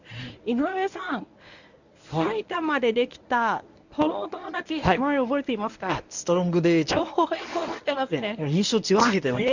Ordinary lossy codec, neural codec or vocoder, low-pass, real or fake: none; codec, 24 kHz, 0.9 kbps, WavTokenizer, medium speech release version 1; 7.2 kHz; fake